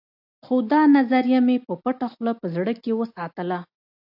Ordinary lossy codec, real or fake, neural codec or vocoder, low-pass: AAC, 48 kbps; real; none; 5.4 kHz